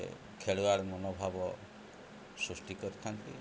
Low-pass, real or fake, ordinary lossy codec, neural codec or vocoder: none; real; none; none